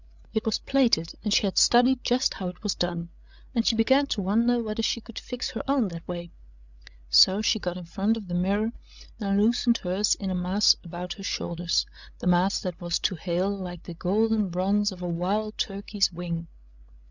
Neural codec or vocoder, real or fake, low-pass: codec, 16 kHz, 16 kbps, FreqCodec, larger model; fake; 7.2 kHz